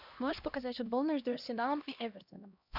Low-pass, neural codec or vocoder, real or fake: 5.4 kHz; codec, 16 kHz, 1 kbps, X-Codec, HuBERT features, trained on LibriSpeech; fake